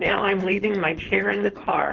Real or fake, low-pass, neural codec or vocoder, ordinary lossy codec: fake; 7.2 kHz; codec, 16 kHz, 4.8 kbps, FACodec; Opus, 16 kbps